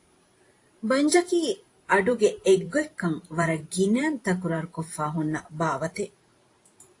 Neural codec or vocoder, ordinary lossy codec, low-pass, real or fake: vocoder, 44.1 kHz, 128 mel bands every 512 samples, BigVGAN v2; AAC, 48 kbps; 10.8 kHz; fake